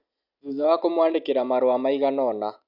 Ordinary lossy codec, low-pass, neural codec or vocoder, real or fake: none; 5.4 kHz; none; real